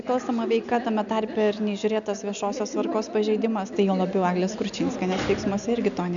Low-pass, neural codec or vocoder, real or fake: 7.2 kHz; none; real